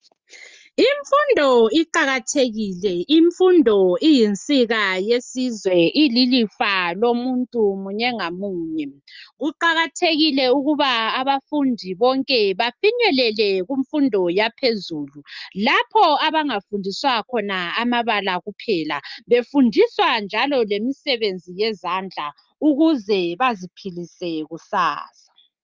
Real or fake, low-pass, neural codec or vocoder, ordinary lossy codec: real; 7.2 kHz; none; Opus, 24 kbps